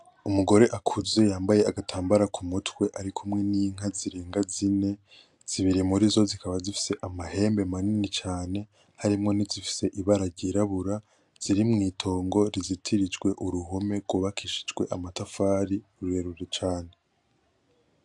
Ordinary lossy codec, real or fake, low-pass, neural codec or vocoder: AAC, 64 kbps; real; 10.8 kHz; none